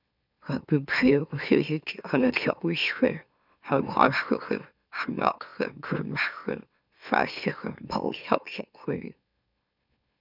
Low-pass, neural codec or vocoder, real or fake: 5.4 kHz; autoencoder, 44.1 kHz, a latent of 192 numbers a frame, MeloTTS; fake